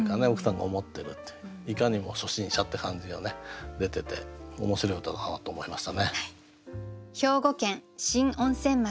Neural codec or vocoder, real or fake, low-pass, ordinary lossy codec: none; real; none; none